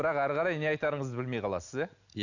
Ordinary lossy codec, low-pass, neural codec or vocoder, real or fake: none; 7.2 kHz; none; real